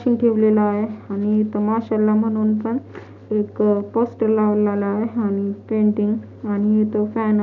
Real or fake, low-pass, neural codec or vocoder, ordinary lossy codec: real; 7.2 kHz; none; none